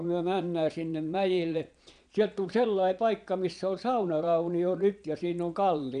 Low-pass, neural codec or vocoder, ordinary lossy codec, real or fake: 9.9 kHz; vocoder, 22.05 kHz, 80 mel bands, Vocos; none; fake